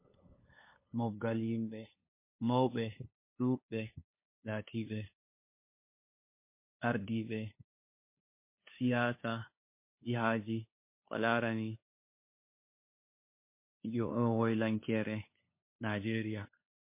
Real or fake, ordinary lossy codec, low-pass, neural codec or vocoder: fake; MP3, 24 kbps; 3.6 kHz; codec, 16 kHz, 2 kbps, FunCodec, trained on LibriTTS, 25 frames a second